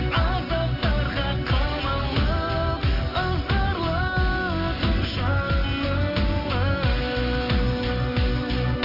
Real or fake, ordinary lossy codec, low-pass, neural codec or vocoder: real; AAC, 32 kbps; 5.4 kHz; none